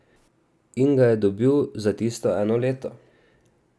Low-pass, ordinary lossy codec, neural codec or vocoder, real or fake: none; none; none; real